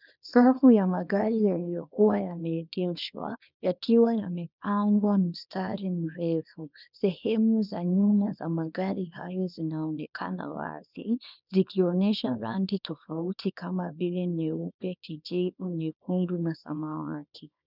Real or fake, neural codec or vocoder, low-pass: fake; codec, 24 kHz, 0.9 kbps, WavTokenizer, small release; 5.4 kHz